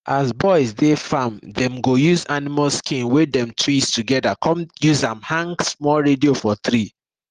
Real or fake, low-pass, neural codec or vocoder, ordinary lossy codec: real; 14.4 kHz; none; Opus, 24 kbps